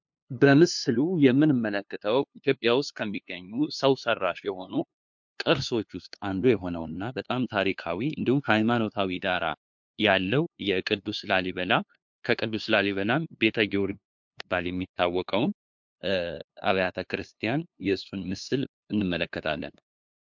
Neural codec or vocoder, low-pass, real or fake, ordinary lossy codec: codec, 16 kHz, 2 kbps, FunCodec, trained on LibriTTS, 25 frames a second; 7.2 kHz; fake; MP3, 64 kbps